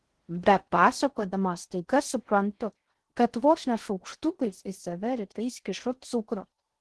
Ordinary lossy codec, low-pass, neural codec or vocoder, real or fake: Opus, 16 kbps; 10.8 kHz; codec, 16 kHz in and 24 kHz out, 0.6 kbps, FocalCodec, streaming, 4096 codes; fake